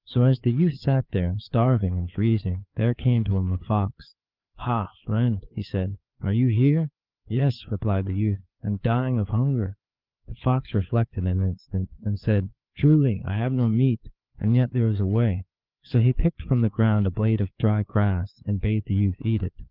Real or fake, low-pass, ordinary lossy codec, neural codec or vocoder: fake; 5.4 kHz; Opus, 32 kbps; codec, 16 kHz, 4 kbps, FreqCodec, larger model